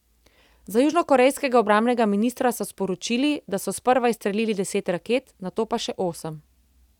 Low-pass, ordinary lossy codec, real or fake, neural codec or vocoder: 19.8 kHz; none; real; none